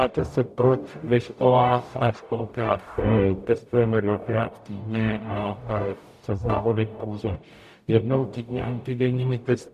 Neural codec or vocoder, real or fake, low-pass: codec, 44.1 kHz, 0.9 kbps, DAC; fake; 14.4 kHz